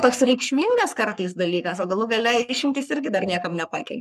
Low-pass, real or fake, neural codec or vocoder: 14.4 kHz; fake; codec, 44.1 kHz, 3.4 kbps, Pupu-Codec